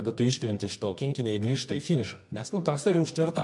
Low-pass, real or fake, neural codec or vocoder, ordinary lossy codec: 10.8 kHz; fake; codec, 24 kHz, 0.9 kbps, WavTokenizer, medium music audio release; MP3, 64 kbps